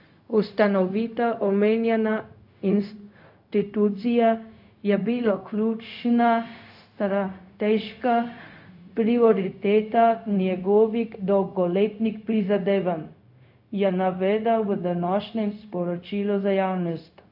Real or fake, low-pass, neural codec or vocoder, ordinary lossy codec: fake; 5.4 kHz; codec, 16 kHz, 0.4 kbps, LongCat-Audio-Codec; AAC, 48 kbps